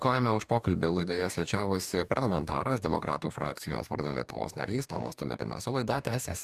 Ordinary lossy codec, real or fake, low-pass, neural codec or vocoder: Opus, 64 kbps; fake; 14.4 kHz; codec, 44.1 kHz, 2.6 kbps, DAC